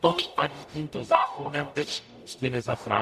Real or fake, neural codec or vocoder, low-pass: fake; codec, 44.1 kHz, 0.9 kbps, DAC; 14.4 kHz